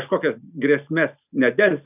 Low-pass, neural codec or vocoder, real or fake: 3.6 kHz; none; real